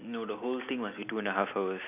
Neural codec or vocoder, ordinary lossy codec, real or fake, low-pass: none; none; real; 3.6 kHz